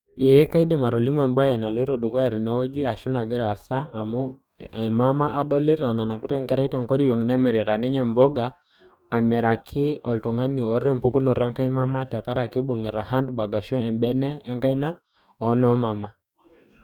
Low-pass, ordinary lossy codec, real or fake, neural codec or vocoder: 19.8 kHz; none; fake; codec, 44.1 kHz, 2.6 kbps, DAC